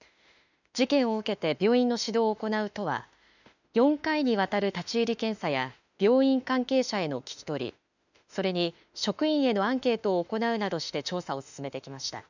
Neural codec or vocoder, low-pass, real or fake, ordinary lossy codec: autoencoder, 48 kHz, 32 numbers a frame, DAC-VAE, trained on Japanese speech; 7.2 kHz; fake; none